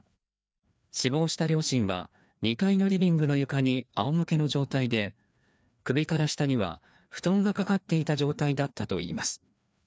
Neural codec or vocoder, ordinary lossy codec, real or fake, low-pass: codec, 16 kHz, 2 kbps, FreqCodec, larger model; none; fake; none